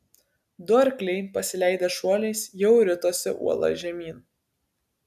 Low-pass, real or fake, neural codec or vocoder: 14.4 kHz; real; none